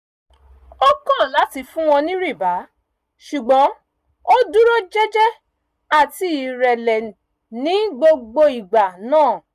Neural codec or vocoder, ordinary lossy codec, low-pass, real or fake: none; none; 14.4 kHz; real